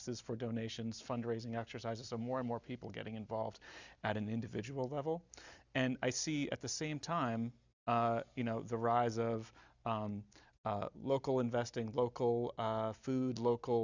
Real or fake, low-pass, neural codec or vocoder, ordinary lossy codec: real; 7.2 kHz; none; Opus, 64 kbps